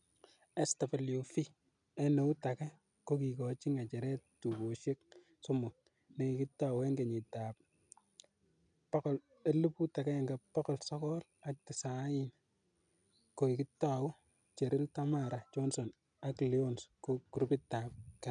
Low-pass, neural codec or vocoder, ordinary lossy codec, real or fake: 9.9 kHz; none; none; real